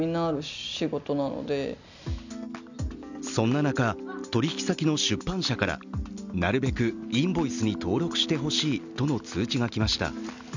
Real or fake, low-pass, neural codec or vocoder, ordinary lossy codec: real; 7.2 kHz; none; none